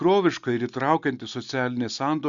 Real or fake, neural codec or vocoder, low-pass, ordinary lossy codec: real; none; 7.2 kHz; Opus, 64 kbps